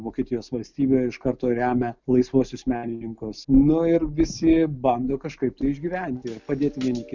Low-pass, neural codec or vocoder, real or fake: 7.2 kHz; none; real